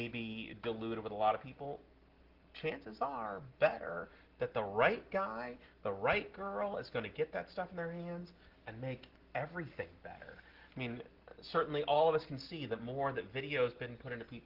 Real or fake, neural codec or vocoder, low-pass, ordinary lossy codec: real; none; 5.4 kHz; Opus, 16 kbps